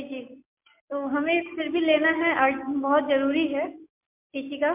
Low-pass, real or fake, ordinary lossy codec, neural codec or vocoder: 3.6 kHz; real; MP3, 32 kbps; none